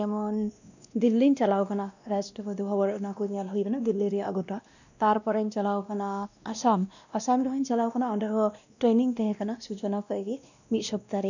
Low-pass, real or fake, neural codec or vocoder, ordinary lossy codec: 7.2 kHz; fake; codec, 16 kHz, 1 kbps, X-Codec, WavLM features, trained on Multilingual LibriSpeech; none